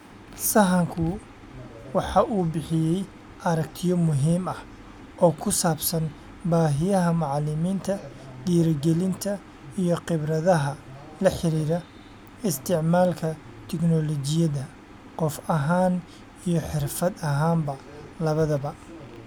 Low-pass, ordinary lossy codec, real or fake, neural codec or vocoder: 19.8 kHz; none; real; none